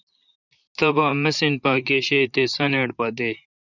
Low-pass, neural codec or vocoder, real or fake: 7.2 kHz; vocoder, 44.1 kHz, 128 mel bands, Pupu-Vocoder; fake